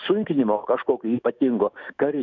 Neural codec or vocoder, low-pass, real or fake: autoencoder, 48 kHz, 128 numbers a frame, DAC-VAE, trained on Japanese speech; 7.2 kHz; fake